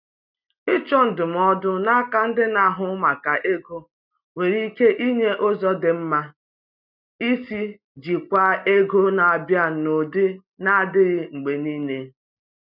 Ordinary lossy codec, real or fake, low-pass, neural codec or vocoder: none; real; 5.4 kHz; none